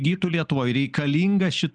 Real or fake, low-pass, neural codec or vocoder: real; 9.9 kHz; none